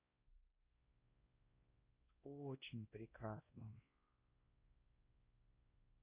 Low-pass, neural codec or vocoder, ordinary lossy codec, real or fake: 3.6 kHz; codec, 16 kHz, 1 kbps, X-Codec, WavLM features, trained on Multilingual LibriSpeech; Opus, 64 kbps; fake